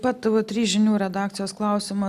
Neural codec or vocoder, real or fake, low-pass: none; real; 14.4 kHz